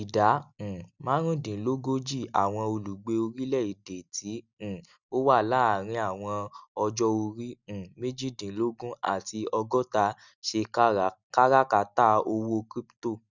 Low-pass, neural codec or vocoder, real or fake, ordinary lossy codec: 7.2 kHz; none; real; none